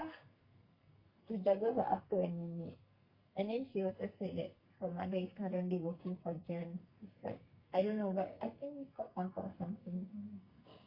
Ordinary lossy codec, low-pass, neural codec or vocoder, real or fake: Opus, 64 kbps; 5.4 kHz; codec, 44.1 kHz, 2.6 kbps, SNAC; fake